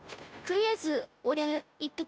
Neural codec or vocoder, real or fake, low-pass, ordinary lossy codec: codec, 16 kHz, 0.5 kbps, FunCodec, trained on Chinese and English, 25 frames a second; fake; none; none